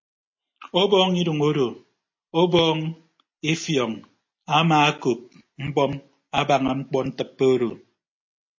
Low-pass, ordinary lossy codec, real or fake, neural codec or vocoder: 7.2 kHz; MP3, 32 kbps; real; none